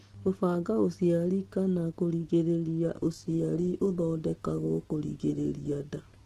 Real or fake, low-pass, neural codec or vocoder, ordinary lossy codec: real; 14.4 kHz; none; Opus, 16 kbps